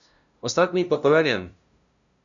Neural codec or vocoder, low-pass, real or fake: codec, 16 kHz, 0.5 kbps, FunCodec, trained on LibriTTS, 25 frames a second; 7.2 kHz; fake